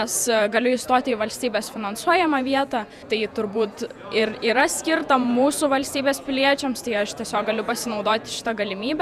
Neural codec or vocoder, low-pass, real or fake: vocoder, 48 kHz, 128 mel bands, Vocos; 14.4 kHz; fake